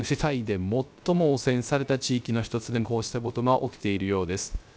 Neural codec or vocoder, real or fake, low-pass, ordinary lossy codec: codec, 16 kHz, 0.3 kbps, FocalCodec; fake; none; none